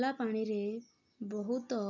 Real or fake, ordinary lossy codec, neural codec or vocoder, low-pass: real; none; none; 7.2 kHz